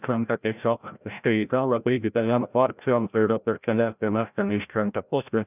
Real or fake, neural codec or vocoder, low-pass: fake; codec, 16 kHz, 0.5 kbps, FreqCodec, larger model; 3.6 kHz